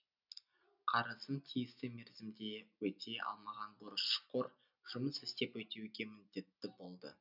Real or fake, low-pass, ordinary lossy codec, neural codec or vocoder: real; 5.4 kHz; none; none